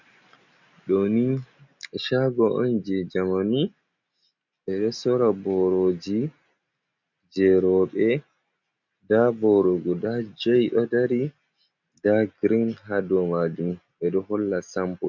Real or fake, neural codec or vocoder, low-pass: real; none; 7.2 kHz